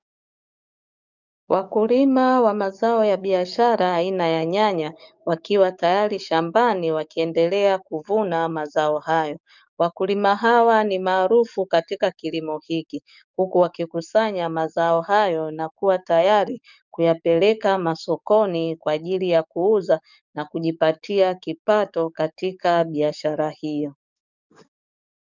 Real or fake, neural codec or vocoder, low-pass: fake; codec, 44.1 kHz, 7.8 kbps, DAC; 7.2 kHz